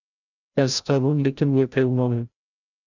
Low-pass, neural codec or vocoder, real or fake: 7.2 kHz; codec, 16 kHz, 0.5 kbps, FreqCodec, larger model; fake